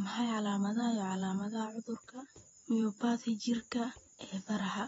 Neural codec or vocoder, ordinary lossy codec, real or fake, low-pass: vocoder, 48 kHz, 128 mel bands, Vocos; AAC, 24 kbps; fake; 19.8 kHz